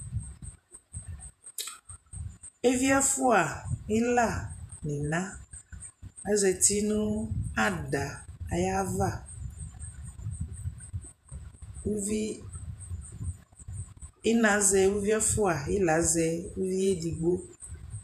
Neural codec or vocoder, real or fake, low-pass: vocoder, 48 kHz, 128 mel bands, Vocos; fake; 14.4 kHz